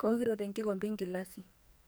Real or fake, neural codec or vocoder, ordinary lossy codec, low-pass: fake; codec, 44.1 kHz, 2.6 kbps, SNAC; none; none